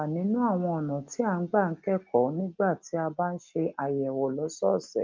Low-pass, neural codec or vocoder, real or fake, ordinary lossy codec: 7.2 kHz; none; real; Opus, 24 kbps